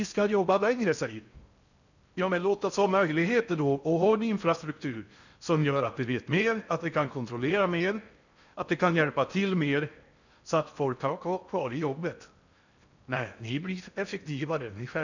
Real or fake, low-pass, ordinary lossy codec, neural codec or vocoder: fake; 7.2 kHz; none; codec, 16 kHz in and 24 kHz out, 0.6 kbps, FocalCodec, streaming, 4096 codes